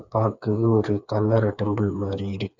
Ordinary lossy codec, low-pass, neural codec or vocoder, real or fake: none; 7.2 kHz; codec, 16 kHz, 4 kbps, FreqCodec, smaller model; fake